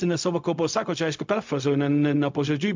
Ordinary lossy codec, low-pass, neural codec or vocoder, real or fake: MP3, 64 kbps; 7.2 kHz; codec, 16 kHz, 0.4 kbps, LongCat-Audio-Codec; fake